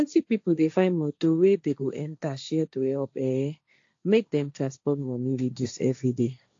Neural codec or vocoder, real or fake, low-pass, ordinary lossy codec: codec, 16 kHz, 1.1 kbps, Voila-Tokenizer; fake; 7.2 kHz; AAC, 48 kbps